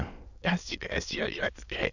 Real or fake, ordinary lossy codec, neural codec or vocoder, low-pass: fake; none; autoencoder, 22.05 kHz, a latent of 192 numbers a frame, VITS, trained on many speakers; 7.2 kHz